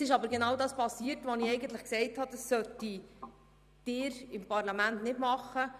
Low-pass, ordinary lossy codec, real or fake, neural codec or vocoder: 14.4 kHz; none; fake; vocoder, 44.1 kHz, 128 mel bands every 256 samples, BigVGAN v2